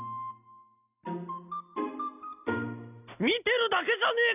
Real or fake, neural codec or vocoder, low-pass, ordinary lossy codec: real; none; 3.6 kHz; none